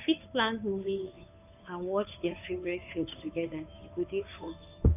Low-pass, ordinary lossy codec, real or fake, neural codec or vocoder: 3.6 kHz; none; fake; codec, 16 kHz, 2 kbps, FunCodec, trained on Chinese and English, 25 frames a second